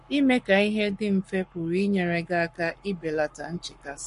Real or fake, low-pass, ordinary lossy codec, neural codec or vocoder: fake; 14.4 kHz; MP3, 48 kbps; codec, 44.1 kHz, 7.8 kbps, Pupu-Codec